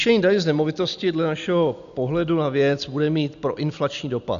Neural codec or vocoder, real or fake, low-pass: none; real; 7.2 kHz